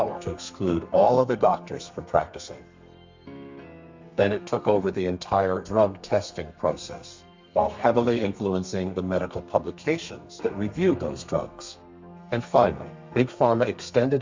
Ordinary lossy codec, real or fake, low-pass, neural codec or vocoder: MP3, 64 kbps; fake; 7.2 kHz; codec, 32 kHz, 1.9 kbps, SNAC